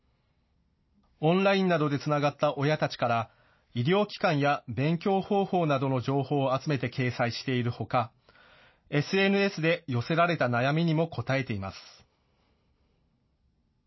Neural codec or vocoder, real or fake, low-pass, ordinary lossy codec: none; real; 7.2 kHz; MP3, 24 kbps